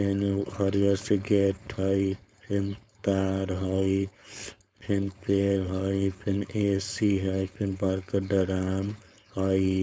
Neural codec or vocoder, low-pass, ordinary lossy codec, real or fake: codec, 16 kHz, 4.8 kbps, FACodec; none; none; fake